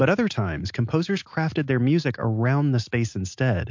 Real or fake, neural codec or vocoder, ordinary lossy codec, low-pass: real; none; MP3, 48 kbps; 7.2 kHz